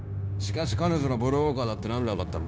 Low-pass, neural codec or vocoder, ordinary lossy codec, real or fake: none; codec, 16 kHz, 0.9 kbps, LongCat-Audio-Codec; none; fake